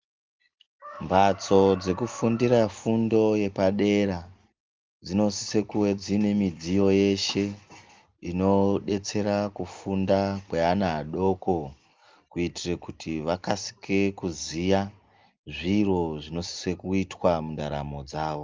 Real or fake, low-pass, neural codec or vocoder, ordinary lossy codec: real; 7.2 kHz; none; Opus, 32 kbps